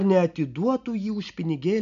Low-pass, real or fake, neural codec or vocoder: 7.2 kHz; real; none